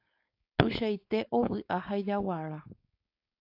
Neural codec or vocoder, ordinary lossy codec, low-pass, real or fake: codec, 16 kHz, 4.8 kbps, FACodec; AAC, 32 kbps; 5.4 kHz; fake